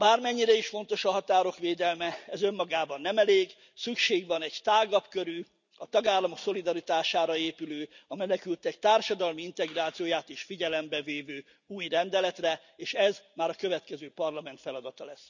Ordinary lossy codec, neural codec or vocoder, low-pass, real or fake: none; none; 7.2 kHz; real